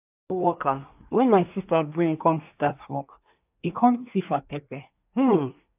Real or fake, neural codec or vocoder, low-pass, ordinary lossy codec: fake; codec, 24 kHz, 1 kbps, SNAC; 3.6 kHz; AAC, 32 kbps